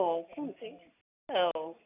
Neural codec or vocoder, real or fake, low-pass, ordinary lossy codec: none; real; 3.6 kHz; none